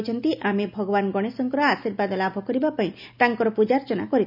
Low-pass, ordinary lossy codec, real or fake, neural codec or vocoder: 5.4 kHz; none; real; none